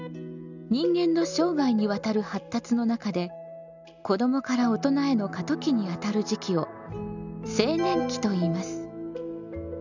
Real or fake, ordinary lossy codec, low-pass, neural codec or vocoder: real; none; 7.2 kHz; none